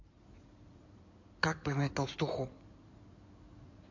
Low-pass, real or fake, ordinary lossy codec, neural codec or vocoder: 7.2 kHz; fake; MP3, 48 kbps; codec, 16 kHz in and 24 kHz out, 2.2 kbps, FireRedTTS-2 codec